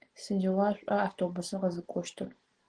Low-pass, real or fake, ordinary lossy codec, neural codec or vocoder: 9.9 kHz; real; Opus, 16 kbps; none